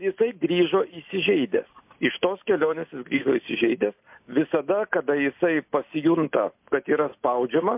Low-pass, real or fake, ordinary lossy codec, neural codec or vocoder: 3.6 kHz; real; MP3, 32 kbps; none